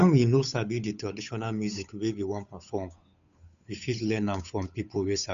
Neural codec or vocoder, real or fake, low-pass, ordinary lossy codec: codec, 16 kHz, 8 kbps, FunCodec, trained on Chinese and English, 25 frames a second; fake; 7.2 kHz; none